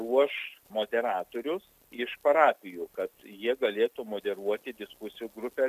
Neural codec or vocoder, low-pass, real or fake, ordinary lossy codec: none; 14.4 kHz; real; MP3, 96 kbps